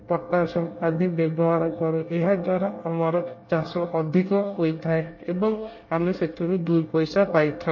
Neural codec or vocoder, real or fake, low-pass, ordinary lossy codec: codec, 24 kHz, 1 kbps, SNAC; fake; 7.2 kHz; MP3, 32 kbps